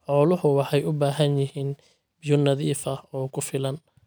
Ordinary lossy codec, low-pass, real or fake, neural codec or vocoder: none; none; real; none